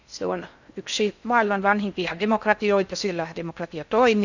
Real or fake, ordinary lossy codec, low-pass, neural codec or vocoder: fake; none; 7.2 kHz; codec, 16 kHz in and 24 kHz out, 0.6 kbps, FocalCodec, streaming, 4096 codes